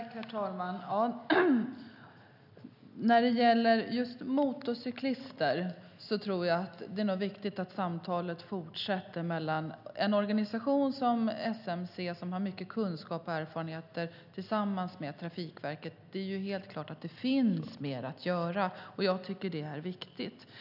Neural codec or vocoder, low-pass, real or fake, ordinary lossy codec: none; 5.4 kHz; real; none